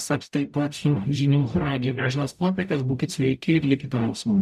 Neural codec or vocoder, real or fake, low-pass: codec, 44.1 kHz, 0.9 kbps, DAC; fake; 14.4 kHz